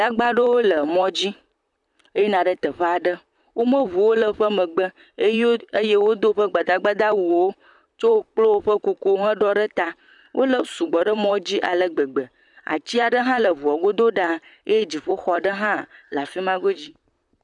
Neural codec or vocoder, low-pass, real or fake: vocoder, 44.1 kHz, 128 mel bands, Pupu-Vocoder; 10.8 kHz; fake